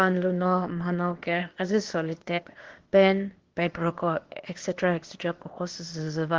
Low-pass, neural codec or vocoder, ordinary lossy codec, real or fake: 7.2 kHz; codec, 16 kHz, 0.8 kbps, ZipCodec; Opus, 16 kbps; fake